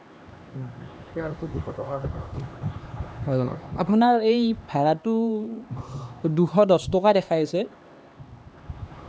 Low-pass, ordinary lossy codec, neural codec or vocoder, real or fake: none; none; codec, 16 kHz, 2 kbps, X-Codec, HuBERT features, trained on LibriSpeech; fake